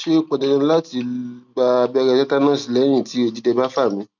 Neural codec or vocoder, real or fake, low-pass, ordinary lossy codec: none; real; 7.2 kHz; none